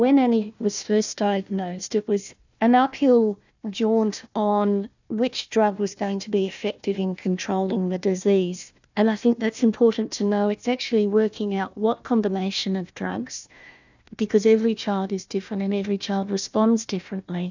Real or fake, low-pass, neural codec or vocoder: fake; 7.2 kHz; codec, 16 kHz, 1 kbps, FunCodec, trained on Chinese and English, 50 frames a second